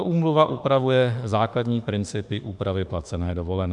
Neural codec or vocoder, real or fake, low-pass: autoencoder, 48 kHz, 32 numbers a frame, DAC-VAE, trained on Japanese speech; fake; 10.8 kHz